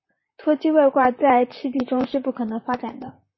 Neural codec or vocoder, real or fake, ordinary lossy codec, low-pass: vocoder, 44.1 kHz, 128 mel bands, Pupu-Vocoder; fake; MP3, 24 kbps; 7.2 kHz